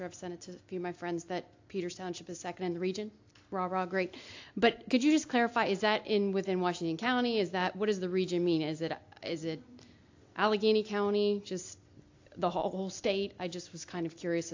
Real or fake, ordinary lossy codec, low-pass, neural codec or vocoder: real; AAC, 48 kbps; 7.2 kHz; none